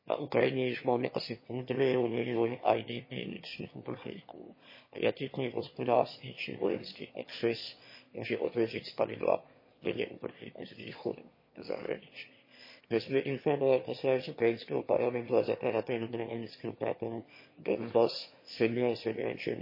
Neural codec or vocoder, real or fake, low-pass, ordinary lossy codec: autoencoder, 22.05 kHz, a latent of 192 numbers a frame, VITS, trained on one speaker; fake; 5.4 kHz; MP3, 24 kbps